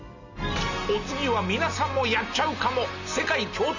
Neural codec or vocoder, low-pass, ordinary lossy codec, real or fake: none; 7.2 kHz; none; real